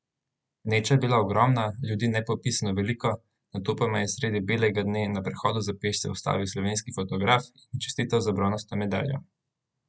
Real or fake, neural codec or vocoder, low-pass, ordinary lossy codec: real; none; none; none